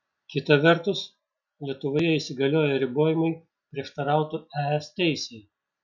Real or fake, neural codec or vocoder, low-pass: real; none; 7.2 kHz